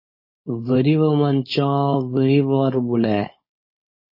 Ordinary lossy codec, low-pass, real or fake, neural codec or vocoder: MP3, 24 kbps; 5.4 kHz; fake; codec, 16 kHz, 4.8 kbps, FACodec